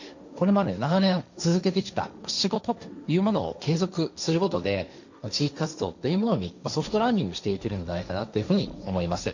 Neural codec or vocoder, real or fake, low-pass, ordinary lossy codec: codec, 16 kHz, 1.1 kbps, Voila-Tokenizer; fake; 7.2 kHz; none